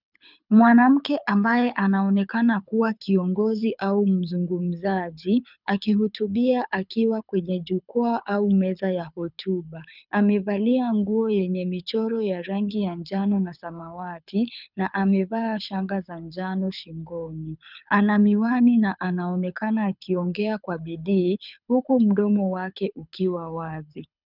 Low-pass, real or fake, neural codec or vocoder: 5.4 kHz; fake; codec, 24 kHz, 6 kbps, HILCodec